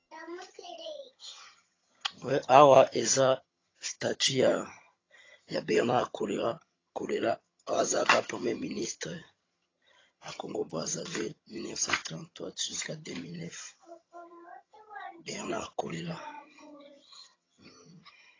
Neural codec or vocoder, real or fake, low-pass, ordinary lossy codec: vocoder, 22.05 kHz, 80 mel bands, HiFi-GAN; fake; 7.2 kHz; AAC, 32 kbps